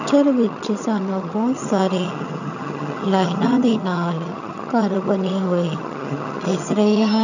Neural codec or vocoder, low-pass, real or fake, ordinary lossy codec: vocoder, 22.05 kHz, 80 mel bands, HiFi-GAN; 7.2 kHz; fake; none